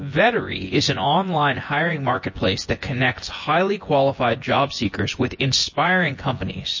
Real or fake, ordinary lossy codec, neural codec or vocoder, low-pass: fake; MP3, 32 kbps; vocoder, 24 kHz, 100 mel bands, Vocos; 7.2 kHz